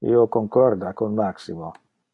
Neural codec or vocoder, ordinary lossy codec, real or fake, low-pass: none; AAC, 48 kbps; real; 10.8 kHz